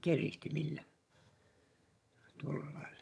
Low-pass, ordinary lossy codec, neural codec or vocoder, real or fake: none; none; vocoder, 22.05 kHz, 80 mel bands, HiFi-GAN; fake